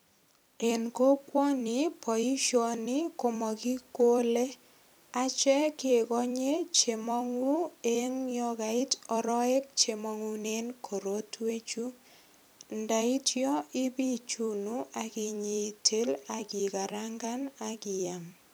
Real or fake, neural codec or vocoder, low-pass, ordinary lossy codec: fake; vocoder, 44.1 kHz, 128 mel bands every 256 samples, BigVGAN v2; none; none